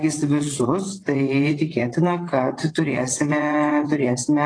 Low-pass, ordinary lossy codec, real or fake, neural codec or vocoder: 9.9 kHz; AAC, 32 kbps; fake; vocoder, 22.05 kHz, 80 mel bands, WaveNeXt